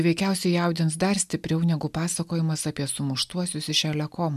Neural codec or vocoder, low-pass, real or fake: none; 14.4 kHz; real